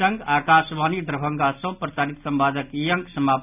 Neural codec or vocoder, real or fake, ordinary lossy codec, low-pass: none; real; none; 3.6 kHz